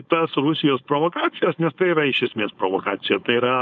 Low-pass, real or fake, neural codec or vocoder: 7.2 kHz; fake; codec, 16 kHz, 4.8 kbps, FACodec